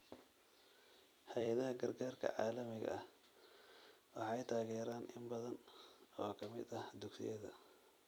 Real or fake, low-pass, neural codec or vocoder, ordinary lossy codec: fake; none; vocoder, 44.1 kHz, 128 mel bands every 256 samples, BigVGAN v2; none